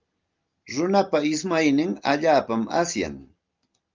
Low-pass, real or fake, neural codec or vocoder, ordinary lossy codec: 7.2 kHz; real; none; Opus, 24 kbps